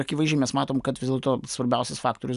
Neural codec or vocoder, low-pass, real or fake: none; 10.8 kHz; real